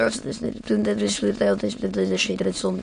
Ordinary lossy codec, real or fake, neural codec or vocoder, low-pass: MP3, 48 kbps; fake; autoencoder, 22.05 kHz, a latent of 192 numbers a frame, VITS, trained on many speakers; 9.9 kHz